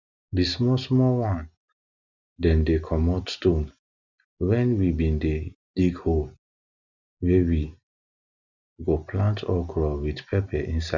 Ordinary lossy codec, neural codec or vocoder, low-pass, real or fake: none; none; 7.2 kHz; real